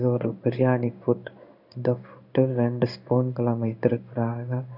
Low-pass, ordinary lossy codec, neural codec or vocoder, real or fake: 5.4 kHz; none; codec, 16 kHz in and 24 kHz out, 1 kbps, XY-Tokenizer; fake